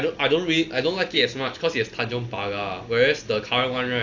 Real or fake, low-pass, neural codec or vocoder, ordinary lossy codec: real; 7.2 kHz; none; AAC, 48 kbps